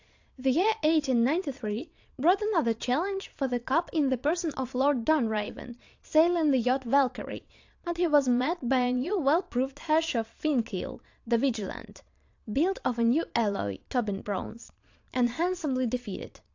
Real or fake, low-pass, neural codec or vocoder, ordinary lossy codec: fake; 7.2 kHz; vocoder, 22.05 kHz, 80 mel bands, Vocos; AAC, 48 kbps